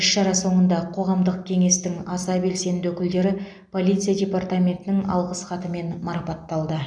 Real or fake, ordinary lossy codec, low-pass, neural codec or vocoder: real; none; 9.9 kHz; none